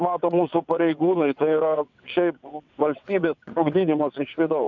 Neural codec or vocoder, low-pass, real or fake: vocoder, 22.05 kHz, 80 mel bands, WaveNeXt; 7.2 kHz; fake